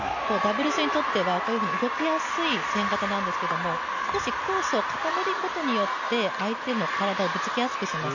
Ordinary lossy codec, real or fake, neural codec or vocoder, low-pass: none; real; none; 7.2 kHz